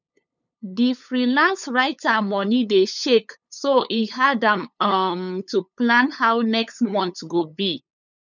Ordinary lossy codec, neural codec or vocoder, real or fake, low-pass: none; codec, 16 kHz, 8 kbps, FunCodec, trained on LibriTTS, 25 frames a second; fake; 7.2 kHz